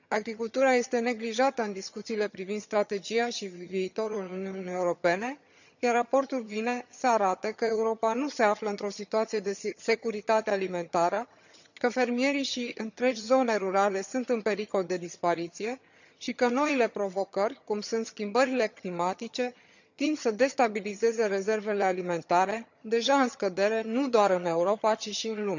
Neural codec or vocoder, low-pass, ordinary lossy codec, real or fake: vocoder, 22.05 kHz, 80 mel bands, HiFi-GAN; 7.2 kHz; none; fake